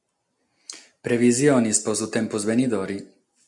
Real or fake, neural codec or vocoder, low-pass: real; none; 10.8 kHz